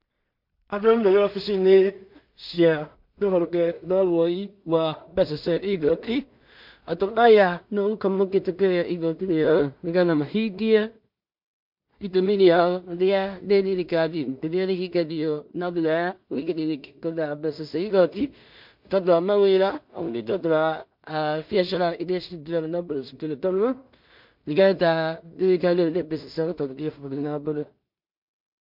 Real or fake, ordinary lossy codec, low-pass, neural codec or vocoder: fake; MP3, 48 kbps; 5.4 kHz; codec, 16 kHz in and 24 kHz out, 0.4 kbps, LongCat-Audio-Codec, two codebook decoder